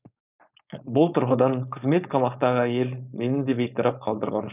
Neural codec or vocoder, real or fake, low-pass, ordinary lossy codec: codec, 16 kHz, 4.8 kbps, FACodec; fake; 3.6 kHz; none